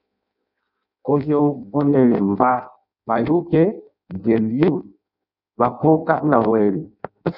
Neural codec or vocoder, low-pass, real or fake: codec, 16 kHz in and 24 kHz out, 0.6 kbps, FireRedTTS-2 codec; 5.4 kHz; fake